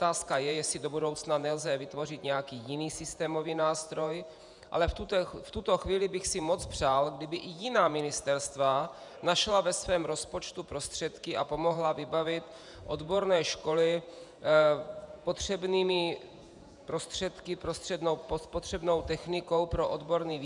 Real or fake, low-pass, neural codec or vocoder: fake; 10.8 kHz; vocoder, 48 kHz, 128 mel bands, Vocos